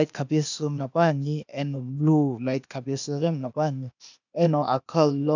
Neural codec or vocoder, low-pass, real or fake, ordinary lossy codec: codec, 16 kHz, 0.8 kbps, ZipCodec; 7.2 kHz; fake; none